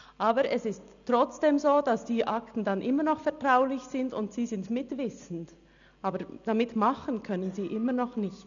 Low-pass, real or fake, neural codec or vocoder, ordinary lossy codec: 7.2 kHz; real; none; none